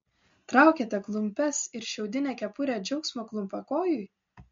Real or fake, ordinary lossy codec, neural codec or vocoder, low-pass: real; MP3, 48 kbps; none; 7.2 kHz